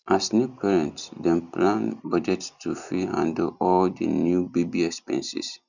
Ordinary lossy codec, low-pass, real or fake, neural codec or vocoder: none; 7.2 kHz; real; none